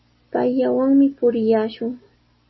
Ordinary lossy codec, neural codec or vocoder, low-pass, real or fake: MP3, 24 kbps; none; 7.2 kHz; real